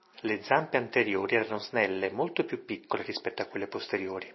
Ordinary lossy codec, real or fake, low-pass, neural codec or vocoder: MP3, 24 kbps; real; 7.2 kHz; none